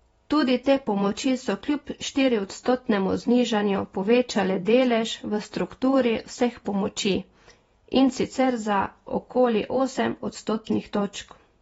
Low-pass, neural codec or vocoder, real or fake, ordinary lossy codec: 19.8 kHz; vocoder, 48 kHz, 128 mel bands, Vocos; fake; AAC, 24 kbps